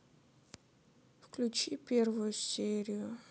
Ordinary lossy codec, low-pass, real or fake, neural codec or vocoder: none; none; real; none